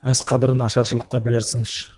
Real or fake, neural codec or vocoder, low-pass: fake; codec, 24 kHz, 1.5 kbps, HILCodec; 10.8 kHz